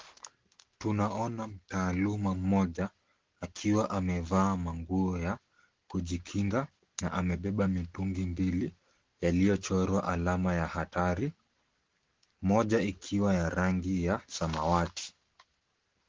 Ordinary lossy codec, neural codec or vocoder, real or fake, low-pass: Opus, 16 kbps; none; real; 7.2 kHz